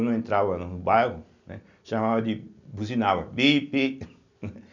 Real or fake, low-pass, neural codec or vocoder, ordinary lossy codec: real; 7.2 kHz; none; none